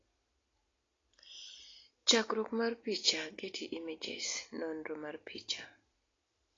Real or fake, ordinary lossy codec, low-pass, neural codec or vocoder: real; AAC, 32 kbps; 7.2 kHz; none